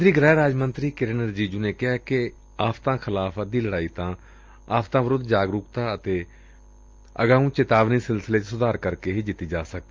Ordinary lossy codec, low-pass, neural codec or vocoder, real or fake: Opus, 24 kbps; 7.2 kHz; none; real